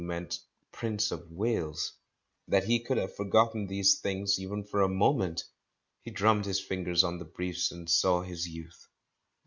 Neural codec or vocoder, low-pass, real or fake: none; 7.2 kHz; real